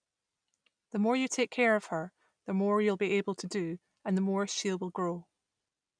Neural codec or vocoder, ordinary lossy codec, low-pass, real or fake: none; none; 9.9 kHz; real